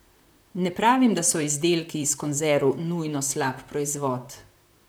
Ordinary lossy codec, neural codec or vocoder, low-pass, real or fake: none; vocoder, 44.1 kHz, 128 mel bands, Pupu-Vocoder; none; fake